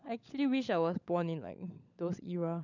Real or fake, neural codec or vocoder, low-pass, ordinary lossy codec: fake; codec, 16 kHz, 16 kbps, FunCodec, trained on LibriTTS, 50 frames a second; 7.2 kHz; none